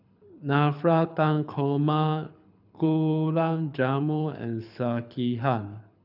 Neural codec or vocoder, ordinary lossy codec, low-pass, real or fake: codec, 24 kHz, 6 kbps, HILCodec; none; 5.4 kHz; fake